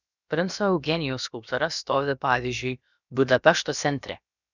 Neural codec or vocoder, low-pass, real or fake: codec, 16 kHz, about 1 kbps, DyCAST, with the encoder's durations; 7.2 kHz; fake